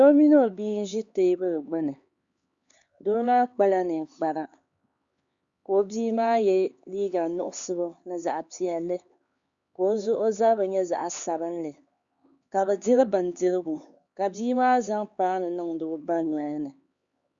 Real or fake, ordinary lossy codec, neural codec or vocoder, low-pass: fake; Opus, 64 kbps; codec, 16 kHz, 4 kbps, X-Codec, HuBERT features, trained on LibriSpeech; 7.2 kHz